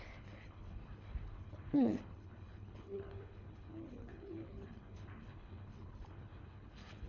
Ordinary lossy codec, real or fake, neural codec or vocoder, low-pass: none; fake; codec, 24 kHz, 3 kbps, HILCodec; 7.2 kHz